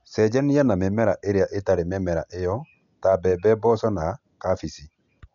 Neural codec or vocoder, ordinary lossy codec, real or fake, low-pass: none; none; real; 7.2 kHz